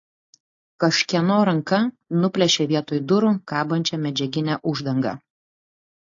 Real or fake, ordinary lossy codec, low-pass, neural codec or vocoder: real; AAC, 48 kbps; 7.2 kHz; none